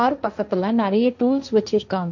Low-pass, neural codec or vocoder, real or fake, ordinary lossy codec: 7.2 kHz; codec, 16 kHz, 1.1 kbps, Voila-Tokenizer; fake; none